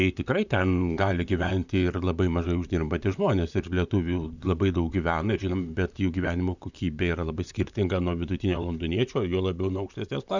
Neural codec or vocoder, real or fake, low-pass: vocoder, 44.1 kHz, 128 mel bands, Pupu-Vocoder; fake; 7.2 kHz